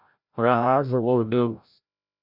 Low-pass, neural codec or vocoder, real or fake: 5.4 kHz; codec, 16 kHz, 0.5 kbps, FreqCodec, larger model; fake